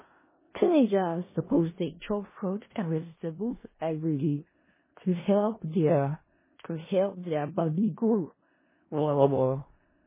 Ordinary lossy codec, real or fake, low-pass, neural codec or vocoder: MP3, 16 kbps; fake; 3.6 kHz; codec, 16 kHz in and 24 kHz out, 0.4 kbps, LongCat-Audio-Codec, four codebook decoder